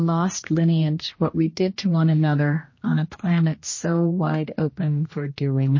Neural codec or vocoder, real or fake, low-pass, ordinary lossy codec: codec, 16 kHz, 1 kbps, X-Codec, HuBERT features, trained on general audio; fake; 7.2 kHz; MP3, 32 kbps